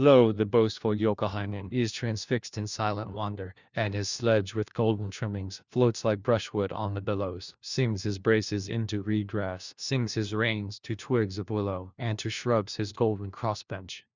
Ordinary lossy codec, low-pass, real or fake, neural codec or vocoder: Opus, 64 kbps; 7.2 kHz; fake; codec, 16 kHz, 1 kbps, FunCodec, trained on LibriTTS, 50 frames a second